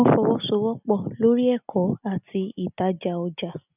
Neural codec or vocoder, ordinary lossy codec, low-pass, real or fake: none; none; 3.6 kHz; real